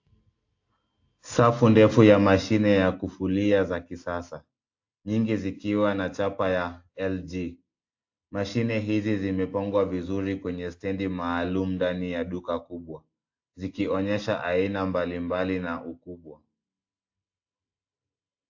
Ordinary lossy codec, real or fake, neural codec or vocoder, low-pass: AAC, 48 kbps; real; none; 7.2 kHz